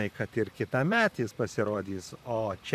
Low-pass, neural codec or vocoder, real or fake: 14.4 kHz; vocoder, 44.1 kHz, 128 mel bands, Pupu-Vocoder; fake